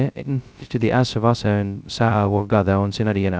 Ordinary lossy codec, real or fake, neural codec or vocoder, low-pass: none; fake; codec, 16 kHz, 0.2 kbps, FocalCodec; none